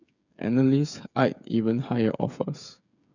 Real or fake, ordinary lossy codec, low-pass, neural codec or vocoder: fake; none; 7.2 kHz; codec, 16 kHz, 8 kbps, FreqCodec, smaller model